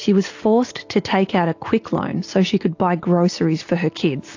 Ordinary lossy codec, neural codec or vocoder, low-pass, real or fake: AAC, 48 kbps; none; 7.2 kHz; real